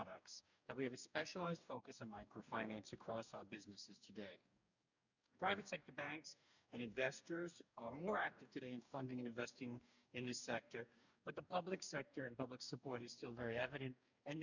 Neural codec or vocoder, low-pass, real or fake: codec, 44.1 kHz, 2.6 kbps, DAC; 7.2 kHz; fake